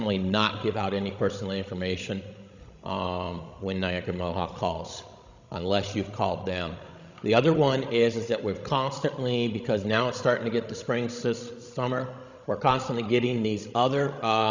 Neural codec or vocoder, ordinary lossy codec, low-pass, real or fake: codec, 16 kHz, 16 kbps, FreqCodec, larger model; Opus, 64 kbps; 7.2 kHz; fake